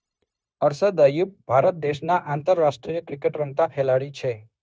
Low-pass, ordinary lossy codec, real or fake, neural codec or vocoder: none; none; fake; codec, 16 kHz, 0.9 kbps, LongCat-Audio-Codec